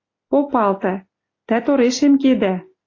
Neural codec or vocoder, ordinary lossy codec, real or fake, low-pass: none; AAC, 32 kbps; real; 7.2 kHz